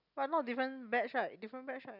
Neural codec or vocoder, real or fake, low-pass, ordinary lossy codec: none; real; 5.4 kHz; none